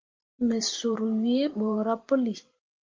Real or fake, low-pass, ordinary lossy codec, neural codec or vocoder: fake; 7.2 kHz; Opus, 32 kbps; vocoder, 44.1 kHz, 128 mel bands every 512 samples, BigVGAN v2